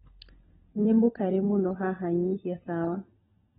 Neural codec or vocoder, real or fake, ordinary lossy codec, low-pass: codec, 16 kHz, 16 kbps, FunCodec, trained on LibriTTS, 50 frames a second; fake; AAC, 16 kbps; 7.2 kHz